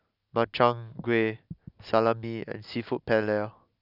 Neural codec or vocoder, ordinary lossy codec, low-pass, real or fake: none; none; 5.4 kHz; real